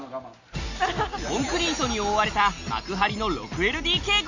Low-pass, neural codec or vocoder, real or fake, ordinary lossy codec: 7.2 kHz; none; real; none